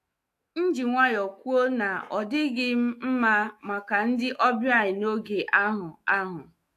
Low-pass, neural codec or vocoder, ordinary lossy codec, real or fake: 14.4 kHz; autoencoder, 48 kHz, 128 numbers a frame, DAC-VAE, trained on Japanese speech; AAC, 64 kbps; fake